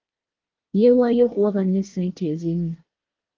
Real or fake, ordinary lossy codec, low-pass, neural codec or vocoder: fake; Opus, 32 kbps; 7.2 kHz; codec, 24 kHz, 1 kbps, SNAC